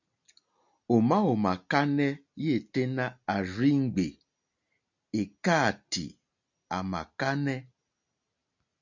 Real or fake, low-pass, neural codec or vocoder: real; 7.2 kHz; none